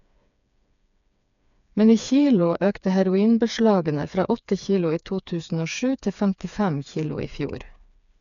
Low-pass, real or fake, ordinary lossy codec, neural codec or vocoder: 7.2 kHz; fake; none; codec, 16 kHz, 4 kbps, FreqCodec, smaller model